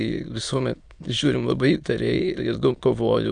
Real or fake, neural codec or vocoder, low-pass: fake; autoencoder, 22.05 kHz, a latent of 192 numbers a frame, VITS, trained on many speakers; 9.9 kHz